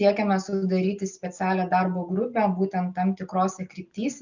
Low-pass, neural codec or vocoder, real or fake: 7.2 kHz; none; real